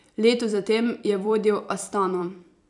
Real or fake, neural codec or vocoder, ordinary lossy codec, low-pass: fake; vocoder, 44.1 kHz, 128 mel bands every 256 samples, BigVGAN v2; none; 10.8 kHz